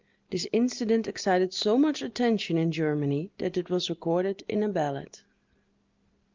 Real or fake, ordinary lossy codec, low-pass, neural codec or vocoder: real; Opus, 24 kbps; 7.2 kHz; none